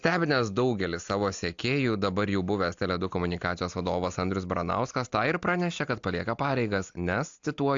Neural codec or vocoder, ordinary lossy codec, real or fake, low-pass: none; AAC, 64 kbps; real; 7.2 kHz